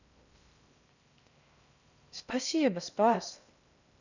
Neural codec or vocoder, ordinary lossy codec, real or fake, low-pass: codec, 16 kHz in and 24 kHz out, 0.6 kbps, FocalCodec, streaming, 4096 codes; none; fake; 7.2 kHz